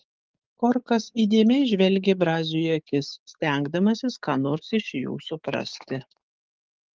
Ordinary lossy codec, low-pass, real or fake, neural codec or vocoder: Opus, 32 kbps; 7.2 kHz; fake; codec, 16 kHz, 6 kbps, DAC